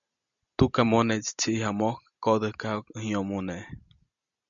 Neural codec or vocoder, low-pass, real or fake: none; 7.2 kHz; real